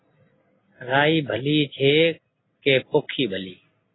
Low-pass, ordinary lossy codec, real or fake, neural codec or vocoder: 7.2 kHz; AAC, 16 kbps; real; none